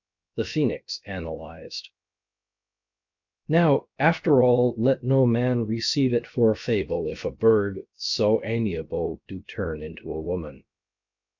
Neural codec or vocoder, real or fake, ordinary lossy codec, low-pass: codec, 16 kHz, about 1 kbps, DyCAST, with the encoder's durations; fake; MP3, 64 kbps; 7.2 kHz